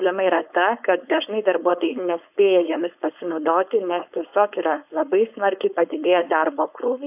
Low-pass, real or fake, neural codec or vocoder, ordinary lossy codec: 3.6 kHz; fake; codec, 16 kHz, 4.8 kbps, FACodec; AAC, 32 kbps